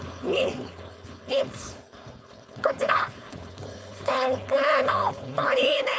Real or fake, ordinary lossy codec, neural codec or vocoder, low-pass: fake; none; codec, 16 kHz, 4.8 kbps, FACodec; none